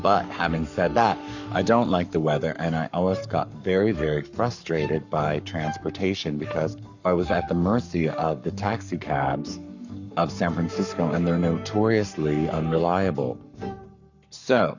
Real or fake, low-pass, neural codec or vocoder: fake; 7.2 kHz; codec, 44.1 kHz, 7.8 kbps, Pupu-Codec